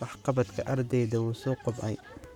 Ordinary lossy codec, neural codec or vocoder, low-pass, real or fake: none; codec, 44.1 kHz, 7.8 kbps, Pupu-Codec; 19.8 kHz; fake